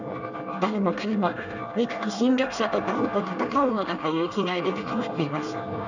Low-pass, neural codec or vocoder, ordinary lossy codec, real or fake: 7.2 kHz; codec, 24 kHz, 1 kbps, SNAC; none; fake